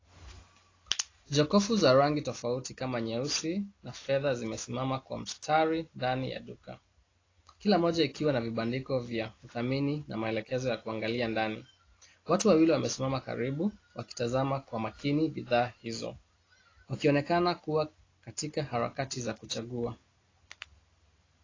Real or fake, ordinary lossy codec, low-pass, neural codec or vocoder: real; AAC, 32 kbps; 7.2 kHz; none